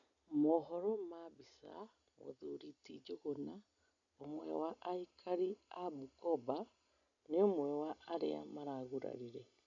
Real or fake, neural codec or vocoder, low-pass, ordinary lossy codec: real; none; 7.2 kHz; none